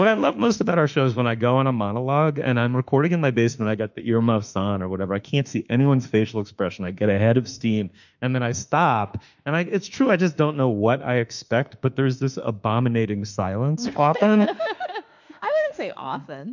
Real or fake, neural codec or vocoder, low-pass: fake; autoencoder, 48 kHz, 32 numbers a frame, DAC-VAE, trained on Japanese speech; 7.2 kHz